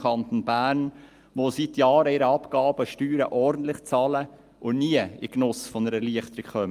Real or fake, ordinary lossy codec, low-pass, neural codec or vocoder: real; Opus, 32 kbps; 14.4 kHz; none